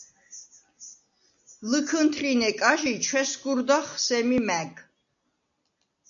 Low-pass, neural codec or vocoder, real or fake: 7.2 kHz; none; real